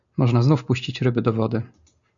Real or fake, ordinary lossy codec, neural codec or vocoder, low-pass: real; MP3, 96 kbps; none; 7.2 kHz